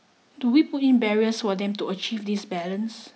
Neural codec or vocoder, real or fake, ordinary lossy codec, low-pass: none; real; none; none